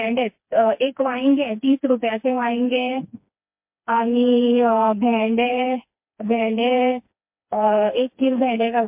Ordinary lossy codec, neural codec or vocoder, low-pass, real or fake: MP3, 32 kbps; codec, 16 kHz, 2 kbps, FreqCodec, smaller model; 3.6 kHz; fake